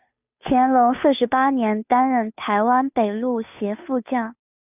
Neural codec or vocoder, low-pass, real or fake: codec, 16 kHz, 2 kbps, FunCodec, trained on Chinese and English, 25 frames a second; 3.6 kHz; fake